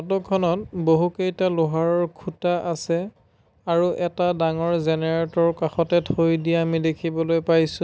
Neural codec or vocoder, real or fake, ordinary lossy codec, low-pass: none; real; none; none